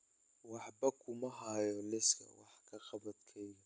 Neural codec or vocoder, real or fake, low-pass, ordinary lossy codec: none; real; none; none